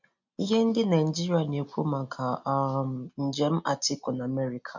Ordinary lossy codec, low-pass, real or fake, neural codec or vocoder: none; 7.2 kHz; real; none